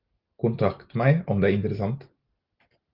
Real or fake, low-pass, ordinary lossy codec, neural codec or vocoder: real; 5.4 kHz; Opus, 32 kbps; none